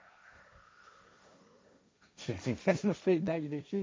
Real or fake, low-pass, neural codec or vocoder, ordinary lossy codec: fake; none; codec, 16 kHz, 1.1 kbps, Voila-Tokenizer; none